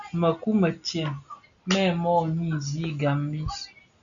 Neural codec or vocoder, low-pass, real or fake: none; 7.2 kHz; real